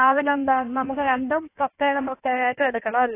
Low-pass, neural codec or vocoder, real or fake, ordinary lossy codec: 3.6 kHz; codec, 16 kHz in and 24 kHz out, 1.1 kbps, FireRedTTS-2 codec; fake; AAC, 24 kbps